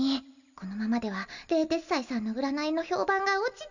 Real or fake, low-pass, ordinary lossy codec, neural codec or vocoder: real; 7.2 kHz; none; none